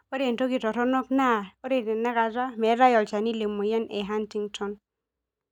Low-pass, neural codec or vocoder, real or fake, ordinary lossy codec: 19.8 kHz; none; real; none